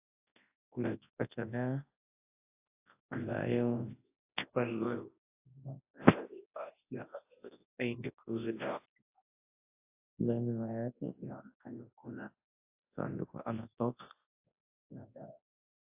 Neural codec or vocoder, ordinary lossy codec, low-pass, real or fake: codec, 24 kHz, 0.9 kbps, WavTokenizer, large speech release; AAC, 24 kbps; 3.6 kHz; fake